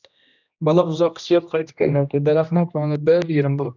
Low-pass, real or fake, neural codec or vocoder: 7.2 kHz; fake; codec, 16 kHz, 1 kbps, X-Codec, HuBERT features, trained on general audio